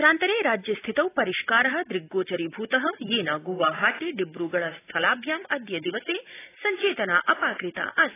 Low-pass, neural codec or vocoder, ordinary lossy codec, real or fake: 3.6 kHz; none; AAC, 16 kbps; real